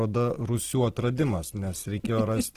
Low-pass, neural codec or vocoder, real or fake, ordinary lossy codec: 14.4 kHz; none; real; Opus, 16 kbps